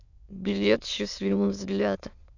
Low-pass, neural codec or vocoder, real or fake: 7.2 kHz; autoencoder, 22.05 kHz, a latent of 192 numbers a frame, VITS, trained on many speakers; fake